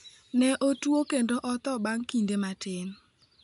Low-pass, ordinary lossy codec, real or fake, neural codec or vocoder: 10.8 kHz; none; real; none